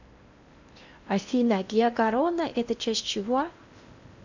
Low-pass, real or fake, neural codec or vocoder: 7.2 kHz; fake; codec, 16 kHz in and 24 kHz out, 0.8 kbps, FocalCodec, streaming, 65536 codes